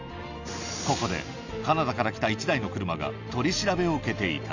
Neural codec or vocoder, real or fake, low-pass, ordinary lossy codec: none; real; 7.2 kHz; none